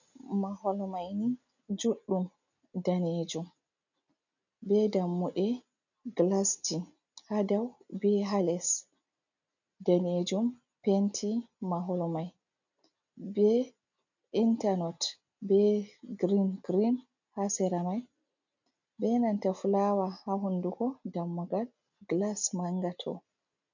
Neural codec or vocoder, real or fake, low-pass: none; real; 7.2 kHz